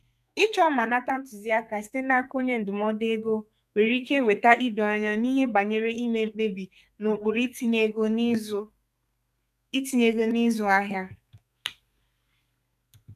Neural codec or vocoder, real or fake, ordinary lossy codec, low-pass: codec, 32 kHz, 1.9 kbps, SNAC; fake; none; 14.4 kHz